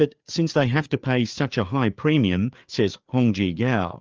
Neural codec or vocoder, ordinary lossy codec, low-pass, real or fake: codec, 16 kHz, 4 kbps, FreqCodec, larger model; Opus, 24 kbps; 7.2 kHz; fake